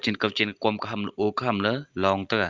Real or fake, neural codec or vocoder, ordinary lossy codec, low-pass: real; none; Opus, 32 kbps; 7.2 kHz